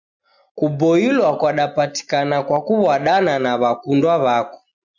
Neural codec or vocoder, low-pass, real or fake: none; 7.2 kHz; real